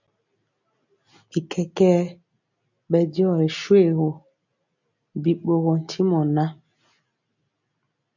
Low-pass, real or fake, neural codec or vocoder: 7.2 kHz; real; none